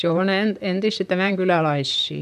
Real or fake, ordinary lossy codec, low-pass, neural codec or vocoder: fake; none; 14.4 kHz; vocoder, 44.1 kHz, 128 mel bands, Pupu-Vocoder